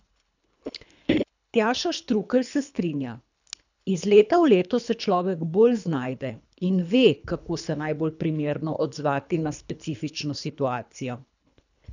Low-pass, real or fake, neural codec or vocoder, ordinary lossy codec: 7.2 kHz; fake; codec, 24 kHz, 3 kbps, HILCodec; none